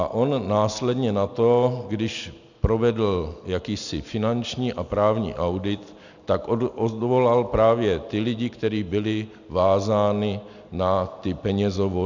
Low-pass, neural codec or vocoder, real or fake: 7.2 kHz; none; real